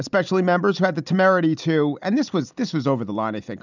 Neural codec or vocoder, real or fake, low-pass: none; real; 7.2 kHz